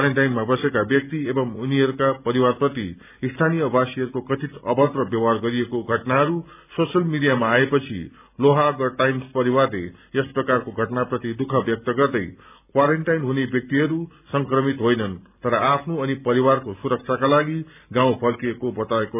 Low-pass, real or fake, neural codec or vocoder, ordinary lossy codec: 3.6 kHz; real; none; none